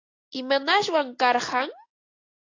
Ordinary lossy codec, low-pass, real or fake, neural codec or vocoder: AAC, 32 kbps; 7.2 kHz; real; none